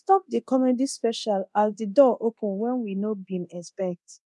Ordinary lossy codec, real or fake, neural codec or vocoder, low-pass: none; fake; codec, 24 kHz, 0.9 kbps, DualCodec; none